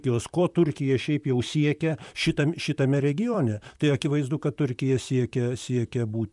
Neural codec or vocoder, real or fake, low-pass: vocoder, 44.1 kHz, 128 mel bands, Pupu-Vocoder; fake; 10.8 kHz